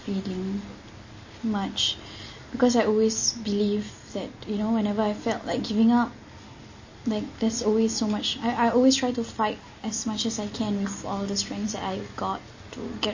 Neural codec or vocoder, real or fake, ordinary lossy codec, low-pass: none; real; MP3, 32 kbps; 7.2 kHz